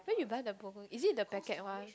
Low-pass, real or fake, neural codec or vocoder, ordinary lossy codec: none; real; none; none